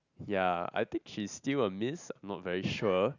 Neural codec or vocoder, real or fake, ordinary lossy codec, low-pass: none; real; none; 7.2 kHz